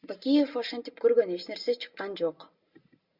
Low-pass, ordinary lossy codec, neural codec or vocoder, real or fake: 5.4 kHz; Opus, 64 kbps; none; real